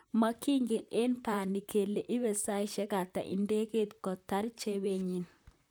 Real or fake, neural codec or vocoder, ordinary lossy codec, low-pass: fake; vocoder, 44.1 kHz, 128 mel bands, Pupu-Vocoder; none; none